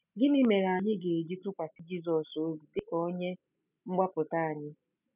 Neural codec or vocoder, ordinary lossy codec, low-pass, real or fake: none; none; 3.6 kHz; real